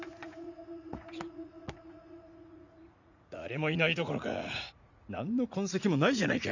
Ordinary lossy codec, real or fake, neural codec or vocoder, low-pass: none; fake; vocoder, 44.1 kHz, 80 mel bands, Vocos; 7.2 kHz